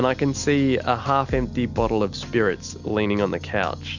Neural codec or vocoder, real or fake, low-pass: none; real; 7.2 kHz